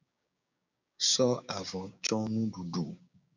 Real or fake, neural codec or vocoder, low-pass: fake; codec, 16 kHz, 6 kbps, DAC; 7.2 kHz